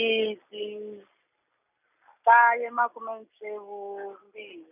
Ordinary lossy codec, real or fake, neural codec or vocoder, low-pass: none; real; none; 3.6 kHz